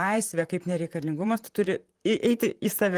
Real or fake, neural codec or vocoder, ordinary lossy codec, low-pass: fake; vocoder, 44.1 kHz, 128 mel bands, Pupu-Vocoder; Opus, 24 kbps; 14.4 kHz